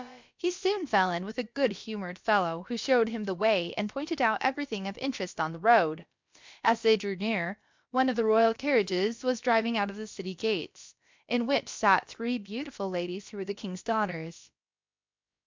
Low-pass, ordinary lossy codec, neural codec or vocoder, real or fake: 7.2 kHz; MP3, 64 kbps; codec, 16 kHz, about 1 kbps, DyCAST, with the encoder's durations; fake